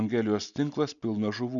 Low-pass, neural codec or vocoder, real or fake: 7.2 kHz; none; real